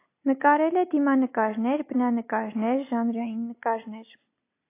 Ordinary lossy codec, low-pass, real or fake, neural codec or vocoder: AAC, 24 kbps; 3.6 kHz; real; none